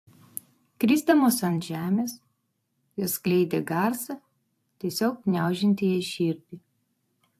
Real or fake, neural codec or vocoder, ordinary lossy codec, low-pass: fake; vocoder, 44.1 kHz, 128 mel bands every 256 samples, BigVGAN v2; AAC, 64 kbps; 14.4 kHz